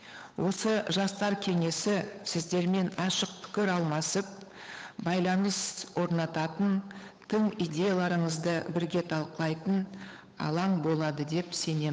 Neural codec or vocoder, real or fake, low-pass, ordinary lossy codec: codec, 16 kHz, 8 kbps, FunCodec, trained on Chinese and English, 25 frames a second; fake; none; none